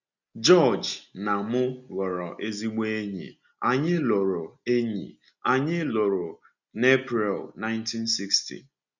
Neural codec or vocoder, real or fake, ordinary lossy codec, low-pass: none; real; none; 7.2 kHz